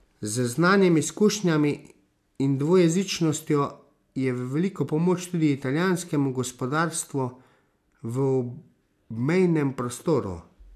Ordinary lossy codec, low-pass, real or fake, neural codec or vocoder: MP3, 96 kbps; 14.4 kHz; real; none